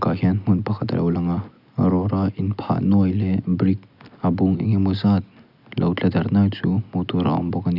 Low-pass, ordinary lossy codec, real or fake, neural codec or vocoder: 5.4 kHz; none; real; none